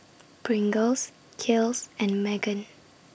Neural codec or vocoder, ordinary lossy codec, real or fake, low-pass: none; none; real; none